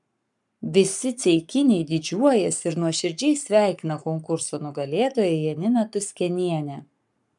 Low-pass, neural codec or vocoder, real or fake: 10.8 kHz; codec, 44.1 kHz, 7.8 kbps, Pupu-Codec; fake